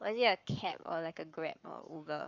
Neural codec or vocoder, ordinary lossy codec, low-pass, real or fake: codec, 44.1 kHz, 7.8 kbps, Pupu-Codec; none; 7.2 kHz; fake